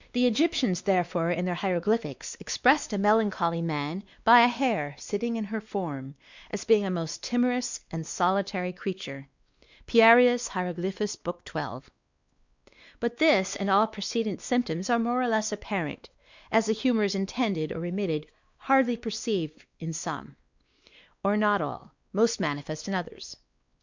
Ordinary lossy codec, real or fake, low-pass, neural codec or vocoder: Opus, 64 kbps; fake; 7.2 kHz; codec, 16 kHz, 2 kbps, X-Codec, WavLM features, trained on Multilingual LibriSpeech